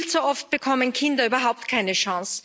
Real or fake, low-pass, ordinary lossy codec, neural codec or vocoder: real; none; none; none